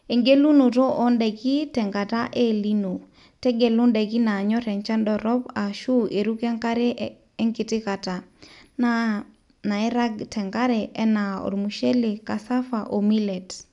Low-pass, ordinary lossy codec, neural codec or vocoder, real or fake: 10.8 kHz; none; none; real